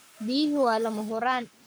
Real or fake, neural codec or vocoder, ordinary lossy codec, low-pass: fake; codec, 44.1 kHz, 7.8 kbps, Pupu-Codec; none; none